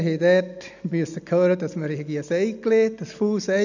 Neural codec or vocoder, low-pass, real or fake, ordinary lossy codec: none; 7.2 kHz; real; none